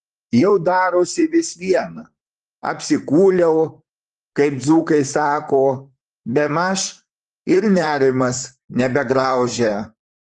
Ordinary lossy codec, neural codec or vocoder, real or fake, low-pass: Opus, 24 kbps; vocoder, 44.1 kHz, 128 mel bands, Pupu-Vocoder; fake; 10.8 kHz